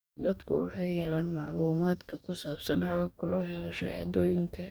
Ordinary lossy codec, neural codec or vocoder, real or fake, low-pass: none; codec, 44.1 kHz, 2.6 kbps, DAC; fake; none